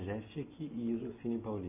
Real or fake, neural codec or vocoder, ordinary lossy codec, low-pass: real; none; AAC, 24 kbps; 3.6 kHz